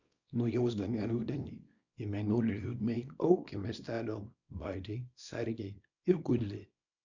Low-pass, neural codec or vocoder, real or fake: 7.2 kHz; codec, 24 kHz, 0.9 kbps, WavTokenizer, small release; fake